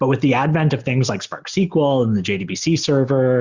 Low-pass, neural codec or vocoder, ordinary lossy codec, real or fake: 7.2 kHz; none; Opus, 64 kbps; real